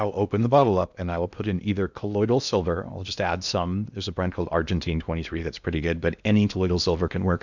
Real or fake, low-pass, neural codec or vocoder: fake; 7.2 kHz; codec, 16 kHz in and 24 kHz out, 0.8 kbps, FocalCodec, streaming, 65536 codes